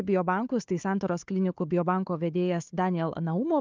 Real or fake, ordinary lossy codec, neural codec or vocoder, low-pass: fake; Opus, 32 kbps; codec, 16 kHz, 4 kbps, FunCodec, trained on Chinese and English, 50 frames a second; 7.2 kHz